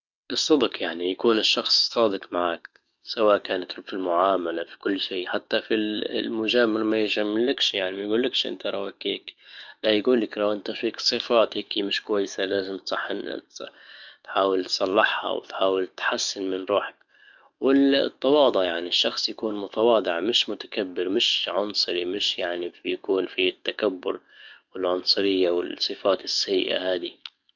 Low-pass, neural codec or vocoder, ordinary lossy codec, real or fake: 7.2 kHz; codec, 44.1 kHz, 7.8 kbps, DAC; none; fake